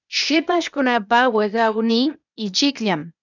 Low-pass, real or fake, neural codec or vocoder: 7.2 kHz; fake; codec, 16 kHz, 0.8 kbps, ZipCodec